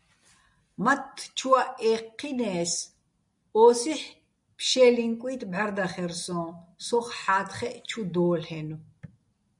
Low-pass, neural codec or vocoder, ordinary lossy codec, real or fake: 10.8 kHz; none; MP3, 96 kbps; real